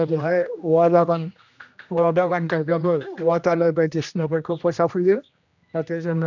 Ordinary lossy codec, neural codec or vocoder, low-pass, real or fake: none; codec, 16 kHz, 1 kbps, X-Codec, HuBERT features, trained on general audio; 7.2 kHz; fake